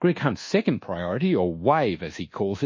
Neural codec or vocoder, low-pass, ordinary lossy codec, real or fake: codec, 24 kHz, 1.2 kbps, DualCodec; 7.2 kHz; MP3, 32 kbps; fake